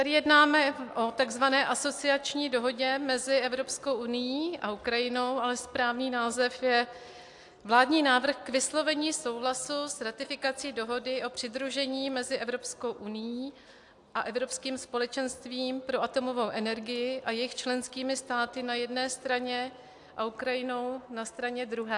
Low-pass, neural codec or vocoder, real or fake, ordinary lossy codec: 10.8 kHz; none; real; AAC, 64 kbps